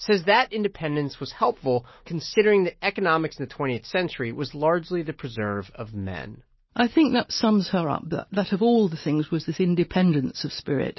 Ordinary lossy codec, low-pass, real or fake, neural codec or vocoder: MP3, 24 kbps; 7.2 kHz; real; none